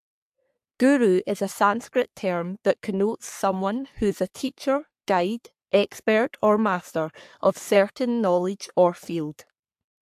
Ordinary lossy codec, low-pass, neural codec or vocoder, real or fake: AAC, 96 kbps; 14.4 kHz; codec, 44.1 kHz, 3.4 kbps, Pupu-Codec; fake